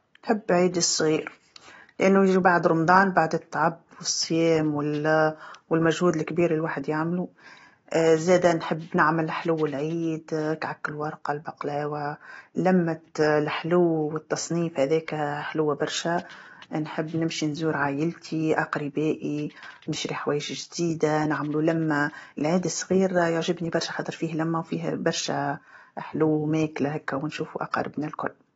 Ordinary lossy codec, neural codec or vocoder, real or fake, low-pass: AAC, 24 kbps; none; real; 19.8 kHz